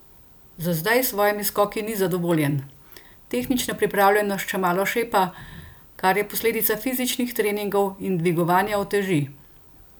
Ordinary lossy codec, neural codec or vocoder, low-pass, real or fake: none; none; none; real